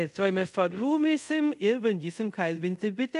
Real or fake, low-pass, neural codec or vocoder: fake; 10.8 kHz; codec, 24 kHz, 0.5 kbps, DualCodec